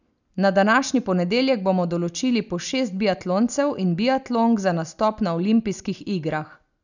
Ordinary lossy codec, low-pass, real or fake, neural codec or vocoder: none; 7.2 kHz; real; none